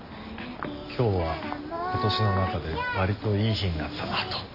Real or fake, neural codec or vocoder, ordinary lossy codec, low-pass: real; none; Opus, 64 kbps; 5.4 kHz